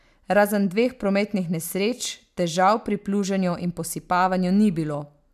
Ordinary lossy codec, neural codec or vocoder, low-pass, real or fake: MP3, 96 kbps; none; 14.4 kHz; real